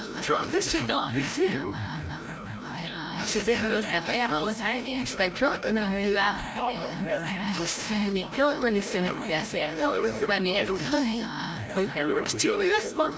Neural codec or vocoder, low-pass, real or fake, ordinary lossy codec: codec, 16 kHz, 0.5 kbps, FreqCodec, larger model; none; fake; none